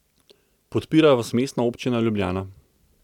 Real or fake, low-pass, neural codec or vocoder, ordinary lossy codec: real; 19.8 kHz; none; none